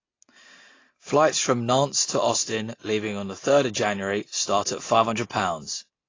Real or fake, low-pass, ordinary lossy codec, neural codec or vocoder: real; 7.2 kHz; AAC, 32 kbps; none